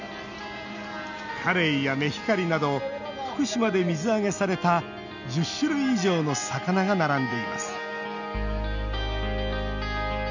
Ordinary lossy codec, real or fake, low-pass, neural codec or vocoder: none; real; 7.2 kHz; none